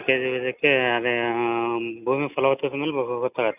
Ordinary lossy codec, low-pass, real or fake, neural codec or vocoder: none; 3.6 kHz; real; none